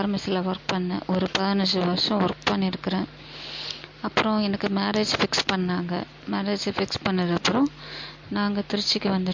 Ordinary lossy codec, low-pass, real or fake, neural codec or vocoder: MP3, 48 kbps; 7.2 kHz; real; none